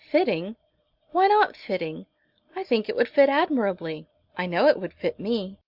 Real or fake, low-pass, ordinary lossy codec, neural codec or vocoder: real; 5.4 kHz; Opus, 64 kbps; none